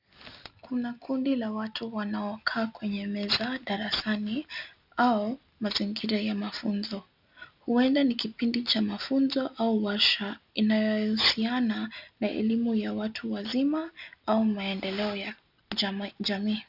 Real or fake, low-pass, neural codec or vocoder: real; 5.4 kHz; none